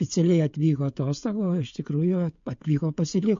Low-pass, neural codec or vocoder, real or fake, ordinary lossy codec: 7.2 kHz; codec, 16 kHz, 16 kbps, FreqCodec, smaller model; fake; MP3, 48 kbps